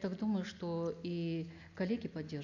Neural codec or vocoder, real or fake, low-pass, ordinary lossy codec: none; real; 7.2 kHz; none